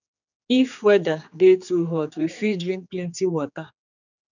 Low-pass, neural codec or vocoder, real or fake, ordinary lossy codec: 7.2 kHz; codec, 16 kHz, 4 kbps, X-Codec, HuBERT features, trained on general audio; fake; none